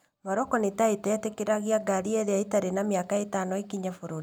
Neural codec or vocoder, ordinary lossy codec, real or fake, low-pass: none; none; real; none